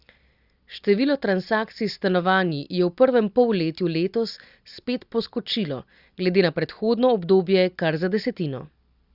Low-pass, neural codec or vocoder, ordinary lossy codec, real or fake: 5.4 kHz; none; Opus, 64 kbps; real